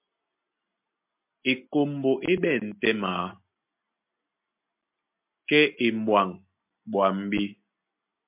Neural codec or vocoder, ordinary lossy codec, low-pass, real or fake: none; MP3, 32 kbps; 3.6 kHz; real